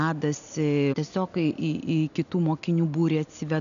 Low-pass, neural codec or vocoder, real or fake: 7.2 kHz; none; real